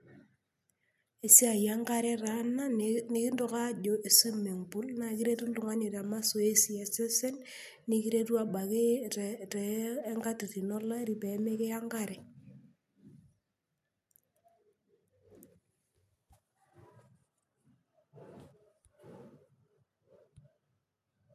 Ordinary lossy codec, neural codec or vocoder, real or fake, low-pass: none; none; real; 14.4 kHz